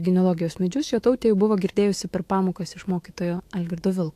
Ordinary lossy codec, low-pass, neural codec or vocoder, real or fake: AAC, 64 kbps; 14.4 kHz; autoencoder, 48 kHz, 128 numbers a frame, DAC-VAE, trained on Japanese speech; fake